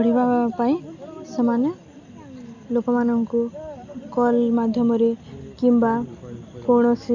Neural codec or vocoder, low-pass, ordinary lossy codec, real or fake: none; 7.2 kHz; none; real